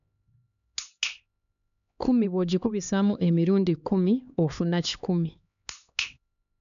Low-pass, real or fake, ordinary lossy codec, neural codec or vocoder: 7.2 kHz; fake; none; codec, 16 kHz, 2 kbps, X-Codec, HuBERT features, trained on LibriSpeech